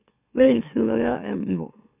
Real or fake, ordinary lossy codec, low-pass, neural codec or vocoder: fake; none; 3.6 kHz; autoencoder, 44.1 kHz, a latent of 192 numbers a frame, MeloTTS